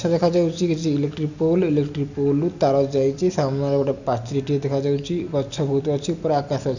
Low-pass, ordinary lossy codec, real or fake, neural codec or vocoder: 7.2 kHz; none; real; none